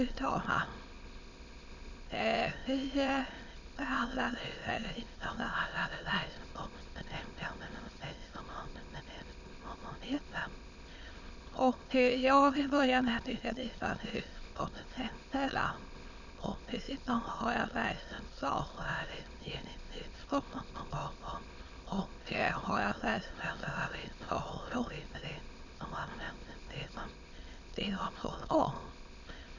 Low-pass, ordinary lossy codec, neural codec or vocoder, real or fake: 7.2 kHz; none; autoencoder, 22.05 kHz, a latent of 192 numbers a frame, VITS, trained on many speakers; fake